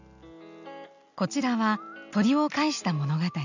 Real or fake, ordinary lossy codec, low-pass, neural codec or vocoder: real; none; 7.2 kHz; none